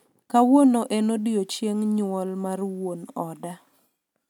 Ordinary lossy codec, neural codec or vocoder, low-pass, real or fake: none; none; 19.8 kHz; real